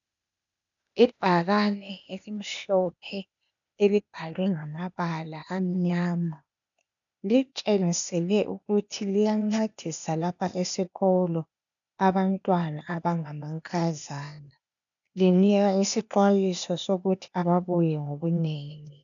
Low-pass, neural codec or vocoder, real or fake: 7.2 kHz; codec, 16 kHz, 0.8 kbps, ZipCodec; fake